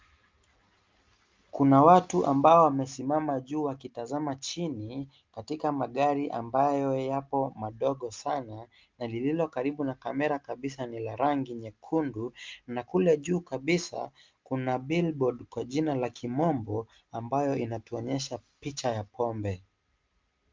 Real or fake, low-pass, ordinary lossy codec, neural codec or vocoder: real; 7.2 kHz; Opus, 24 kbps; none